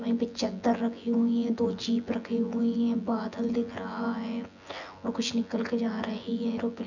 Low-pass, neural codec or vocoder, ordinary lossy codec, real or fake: 7.2 kHz; vocoder, 24 kHz, 100 mel bands, Vocos; none; fake